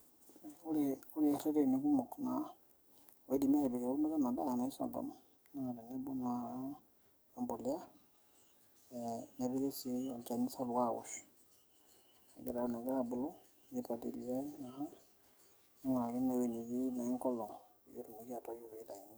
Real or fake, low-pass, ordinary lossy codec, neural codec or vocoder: fake; none; none; codec, 44.1 kHz, 7.8 kbps, DAC